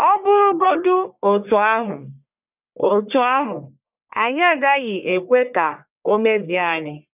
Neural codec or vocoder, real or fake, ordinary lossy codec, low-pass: codec, 44.1 kHz, 1.7 kbps, Pupu-Codec; fake; none; 3.6 kHz